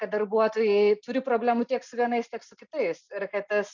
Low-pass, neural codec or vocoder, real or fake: 7.2 kHz; none; real